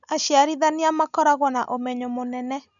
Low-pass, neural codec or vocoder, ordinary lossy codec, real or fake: 7.2 kHz; none; none; real